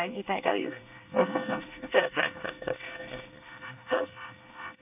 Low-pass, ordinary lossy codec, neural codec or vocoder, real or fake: 3.6 kHz; none; codec, 24 kHz, 1 kbps, SNAC; fake